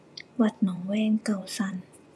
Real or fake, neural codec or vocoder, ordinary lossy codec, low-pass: fake; vocoder, 24 kHz, 100 mel bands, Vocos; none; none